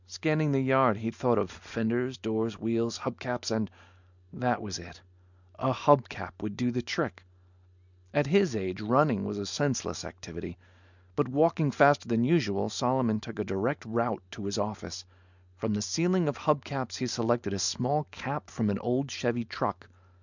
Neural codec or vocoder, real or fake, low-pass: none; real; 7.2 kHz